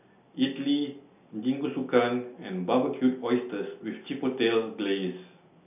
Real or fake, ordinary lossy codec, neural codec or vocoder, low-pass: real; none; none; 3.6 kHz